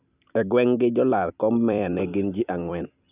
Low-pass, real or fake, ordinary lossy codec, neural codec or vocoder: 3.6 kHz; fake; none; vocoder, 44.1 kHz, 128 mel bands every 256 samples, BigVGAN v2